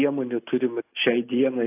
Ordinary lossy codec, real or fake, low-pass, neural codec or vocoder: AAC, 24 kbps; real; 3.6 kHz; none